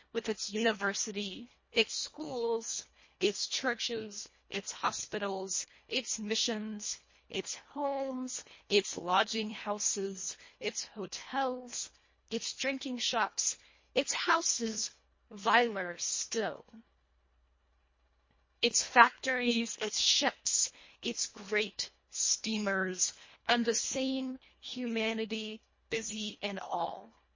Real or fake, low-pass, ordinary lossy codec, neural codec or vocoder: fake; 7.2 kHz; MP3, 32 kbps; codec, 24 kHz, 1.5 kbps, HILCodec